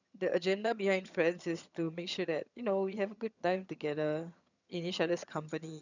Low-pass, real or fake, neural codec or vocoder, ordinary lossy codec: 7.2 kHz; fake; vocoder, 22.05 kHz, 80 mel bands, HiFi-GAN; none